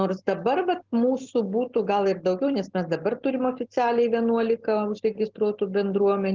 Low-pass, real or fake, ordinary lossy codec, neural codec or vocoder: 7.2 kHz; real; Opus, 16 kbps; none